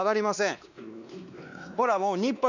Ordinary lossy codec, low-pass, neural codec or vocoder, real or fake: none; 7.2 kHz; codec, 16 kHz, 2 kbps, X-Codec, WavLM features, trained on Multilingual LibriSpeech; fake